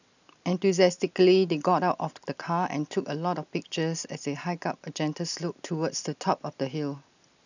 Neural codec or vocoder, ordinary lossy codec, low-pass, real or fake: none; none; 7.2 kHz; real